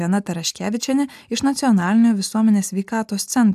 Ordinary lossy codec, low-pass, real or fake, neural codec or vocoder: AAC, 96 kbps; 14.4 kHz; real; none